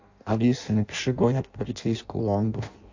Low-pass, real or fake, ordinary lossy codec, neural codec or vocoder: 7.2 kHz; fake; AAC, 48 kbps; codec, 16 kHz in and 24 kHz out, 0.6 kbps, FireRedTTS-2 codec